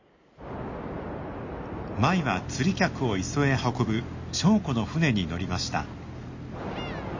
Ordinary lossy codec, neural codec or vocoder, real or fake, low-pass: MP3, 32 kbps; none; real; 7.2 kHz